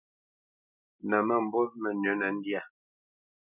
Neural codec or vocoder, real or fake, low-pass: none; real; 3.6 kHz